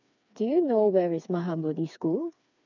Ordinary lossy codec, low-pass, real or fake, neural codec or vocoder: none; 7.2 kHz; fake; codec, 16 kHz, 4 kbps, FreqCodec, smaller model